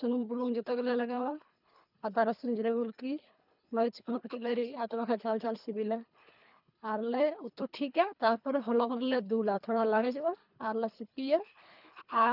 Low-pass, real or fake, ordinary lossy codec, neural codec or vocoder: 5.4 kHz; fake; none; codec, 24 kHz, 3 kbps, HILCodec